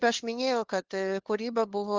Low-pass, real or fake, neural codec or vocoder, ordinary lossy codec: 7.2 kHz; fake; codec, 16 kHz, 2 kbps, FunCodec, trained on LibriTTS, 25 frames a second; Opus, 16 kbps